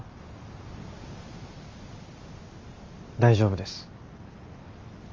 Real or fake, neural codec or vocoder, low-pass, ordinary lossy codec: real; none; 7.2 kHz; Opus, 32 kbps